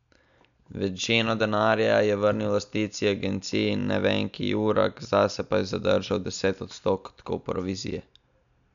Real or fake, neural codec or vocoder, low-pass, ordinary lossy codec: real; none; 7.2 kHz; MP3, 96 kbps